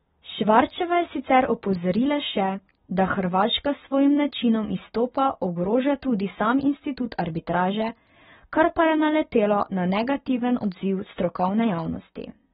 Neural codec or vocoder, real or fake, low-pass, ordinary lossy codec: none; real; 9.9 kHz; AAC, 16 kbps